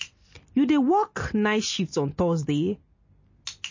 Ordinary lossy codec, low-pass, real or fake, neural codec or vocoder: MP3, 32 kbps; 7.2 kHz; real; none